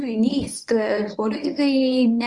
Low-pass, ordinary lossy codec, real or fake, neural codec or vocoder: 10.8 kHz; Opus, 64 kbps; fake; codec, 24 kHz, 0.9 kbps, WavTokenizer, medium speech release version 1